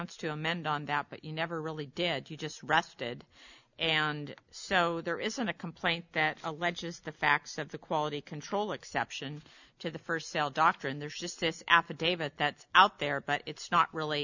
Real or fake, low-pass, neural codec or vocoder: real; 7.2 kHz; none